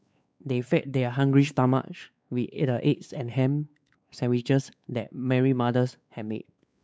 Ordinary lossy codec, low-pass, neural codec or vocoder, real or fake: none; none; codec, 16 kHz, 4 kbps, X-Codec, WavLM features, trained on Multilingual LibriSpeech; fake